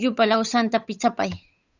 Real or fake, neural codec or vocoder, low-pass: fake; codec, 16 kHz, 8 kbps, FunCodec, trained on Chinese and English, 25 frames a second; 7.2 kHz